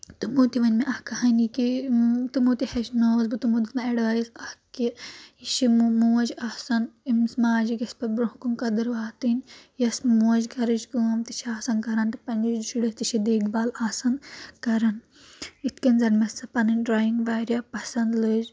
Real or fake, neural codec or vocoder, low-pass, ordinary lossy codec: real; none; none; none